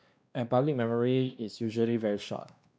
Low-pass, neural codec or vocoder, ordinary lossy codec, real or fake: none; codec, 16 kHz, 2 kbps, X-Codec, WavLM features, trained on Multilingual LibriSpeech; none; fake